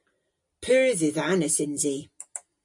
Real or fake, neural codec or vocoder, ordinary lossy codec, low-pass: real; none; MP3, 48 kbps; 10.8 kHz